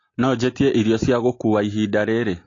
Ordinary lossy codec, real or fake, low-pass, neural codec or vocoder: AAC, 32 kbps; real; 7.2 kHz; none